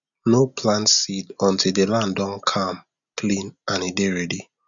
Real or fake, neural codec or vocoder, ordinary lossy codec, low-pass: real; none; none; 7.2 kHz